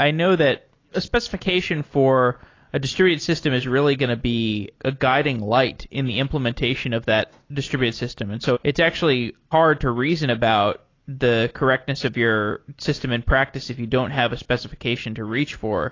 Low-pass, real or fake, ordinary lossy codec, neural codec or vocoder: 7.2 kHz; real; AAC, 32 kbps; none